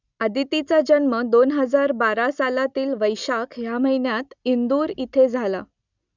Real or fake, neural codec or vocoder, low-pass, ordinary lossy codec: real; none; 7.2 kHz; none